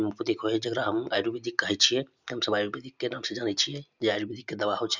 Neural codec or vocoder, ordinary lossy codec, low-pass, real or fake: none; none; 7.2 kHz; real